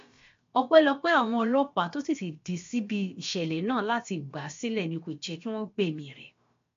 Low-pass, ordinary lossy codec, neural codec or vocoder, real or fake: 7.2 kHz; MP3, 48 kbps; codec, 16 kHz, about 1 kbps, DyCAST, with the encoder's durations; fake